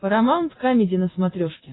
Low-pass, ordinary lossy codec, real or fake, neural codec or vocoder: 7.2 kHz; AAC, 16 kbps; real; none